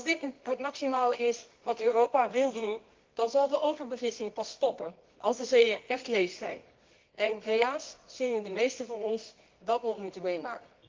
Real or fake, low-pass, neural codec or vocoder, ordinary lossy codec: fake; 7.2 kHz; codec, 24 kHz, 0.9 kbps, WavTokenizer, medium music audio release; Opus, 32 kbps